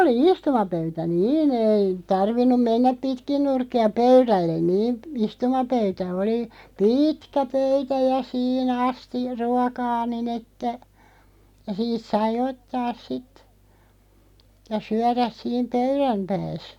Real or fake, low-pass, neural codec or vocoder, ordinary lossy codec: real; 19.8 kHz; none; none